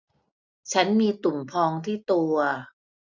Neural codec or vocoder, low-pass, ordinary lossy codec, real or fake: none; 7.2 kHz; none; real